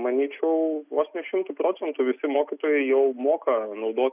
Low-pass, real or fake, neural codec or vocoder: 3.6 kHz; real; none